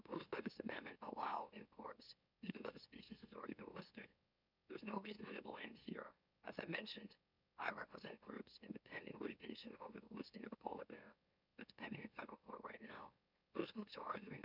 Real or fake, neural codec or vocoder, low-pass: fake; autoencoder, 44.1 kHz, a latent of 192 numbers a frame, MeloTTS; 5.4 kHz